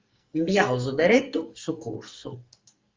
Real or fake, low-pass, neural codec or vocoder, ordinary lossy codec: fake; 7.2 kHz; codec, 44.1 kHz, 2.6 kbps, SNAC; Opus, 32 kbps